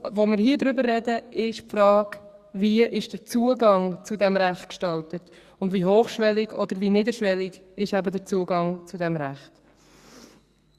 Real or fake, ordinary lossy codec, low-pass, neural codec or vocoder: fake; Opus, 64 kbps; 14.4 kHz; codec, 44.1 kHz, 2.6 kbps, SNAC